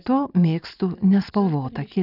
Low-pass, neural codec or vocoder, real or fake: 5.4 kHz; none; real